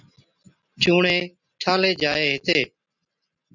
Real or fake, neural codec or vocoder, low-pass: real; none; 7.2 kHz